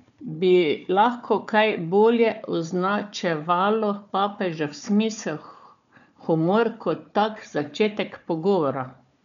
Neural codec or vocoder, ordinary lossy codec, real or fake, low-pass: codec, 16 kHz, 4 kbps, FunCodec, trained on Chinese and English, 50 frames a second; none; fake; 7.2 kHz